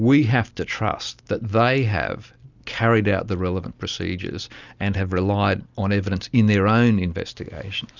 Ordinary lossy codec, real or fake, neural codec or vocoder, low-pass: Opus, 64 kbps; real; none; 7.2 kHz